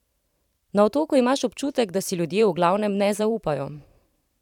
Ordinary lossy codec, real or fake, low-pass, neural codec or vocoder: none; fake; 19.8 kHz; vocoder, 44.1 kHz, 128 mel bands every 512 samples, BigVGAN v2